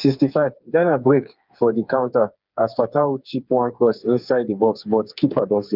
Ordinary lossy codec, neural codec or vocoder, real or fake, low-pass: Opus, 24 kbps; codec, 16 kHz, 4 kbps, FreqCodec, smaller model; fake; 5.4 kHz